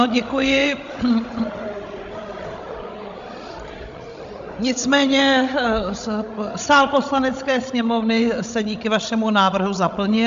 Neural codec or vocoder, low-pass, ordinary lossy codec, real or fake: codec, 16 kHz, 16 kbps, FreqCodec, larger model; 7.2 kHz; MP3, 64 kbps; fake